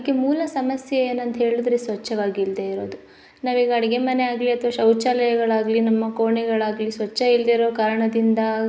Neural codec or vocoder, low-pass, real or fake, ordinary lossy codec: none; none; real; none